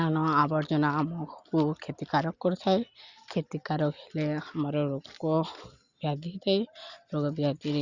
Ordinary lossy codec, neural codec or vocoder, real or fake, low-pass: Opus, 64 kbps; none; real; 7.2 kHz